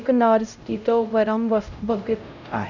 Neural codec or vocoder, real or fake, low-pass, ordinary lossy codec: codec, 16 kHz, 0.5 kbps, X-Codec, HuBERT features, trained on LibriSpeech; fake; 7.2 kHz; none